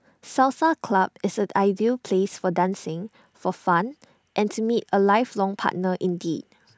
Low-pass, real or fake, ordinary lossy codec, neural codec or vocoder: none; real; none; none